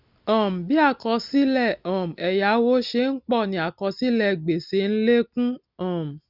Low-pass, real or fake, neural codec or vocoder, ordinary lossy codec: 5.4 kHz; real; none; none